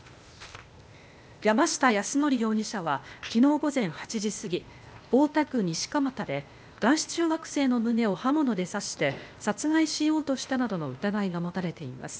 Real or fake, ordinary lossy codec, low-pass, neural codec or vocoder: fake; none; none; codec, 16 kHz, 0.8 kbps, ZipCodec